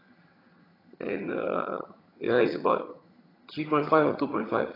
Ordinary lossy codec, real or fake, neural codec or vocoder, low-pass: AAC, 24 kbps; fake; vocoder, 22.05 kHz, 80 mel bands, HiFi-GAN; 5.4 kHz